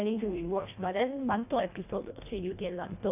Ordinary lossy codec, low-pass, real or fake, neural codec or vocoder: none; 3.6 kHz; fake; codec, 24 kHz, 1.5 kbps, HILCodec